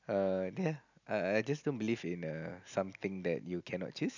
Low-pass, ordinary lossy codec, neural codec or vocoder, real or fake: 7.2 kHz; none; none; real